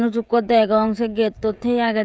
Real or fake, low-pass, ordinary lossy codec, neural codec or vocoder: fake; none; none; codec, 16 kHz, 16 kbps, FreqCodec, smaller model